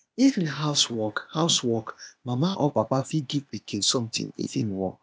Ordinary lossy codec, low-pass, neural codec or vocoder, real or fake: none; none; codec, 16 kHz, 0.8 kbps, ZipCodec; fake